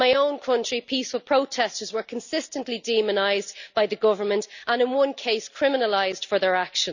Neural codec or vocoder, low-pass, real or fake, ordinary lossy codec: none; 7.2 kHz; real; none